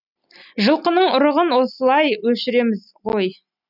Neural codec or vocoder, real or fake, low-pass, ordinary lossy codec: none; real; 5.4 kHz; none